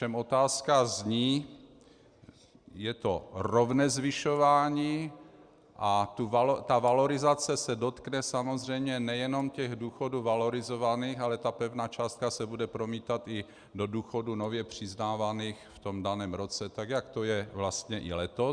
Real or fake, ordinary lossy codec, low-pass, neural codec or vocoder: real; Opus, 64 kbps; 9.9 kHz; none